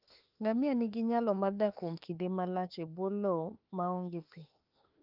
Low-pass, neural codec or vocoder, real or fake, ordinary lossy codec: 5.4 kHz; autoencoder, 48 kHz, 32 numbers a frame, DAC-VAE, trained on Japanese speech; fake; Opus, 24 kbps